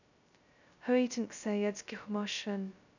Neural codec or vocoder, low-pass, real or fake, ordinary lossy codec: codec, 16 kHz, 0.2 kbps, FocalCodec; 7.2 kHz; fake; none